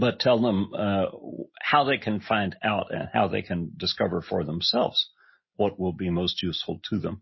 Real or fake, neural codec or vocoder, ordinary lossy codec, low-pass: real; none; MP3, 24 kbps; 7.2 kHz